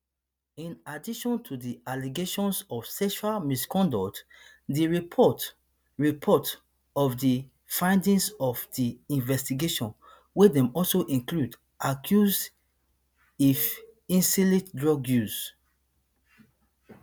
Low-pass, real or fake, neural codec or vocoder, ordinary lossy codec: none; real; none; none